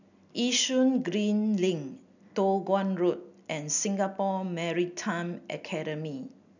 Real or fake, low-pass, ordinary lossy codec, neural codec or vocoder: real; 7.2 kHz; none; none